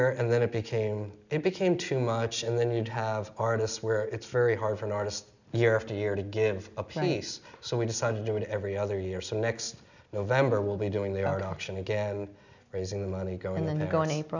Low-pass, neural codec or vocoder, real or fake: 7.2 kHz; none; real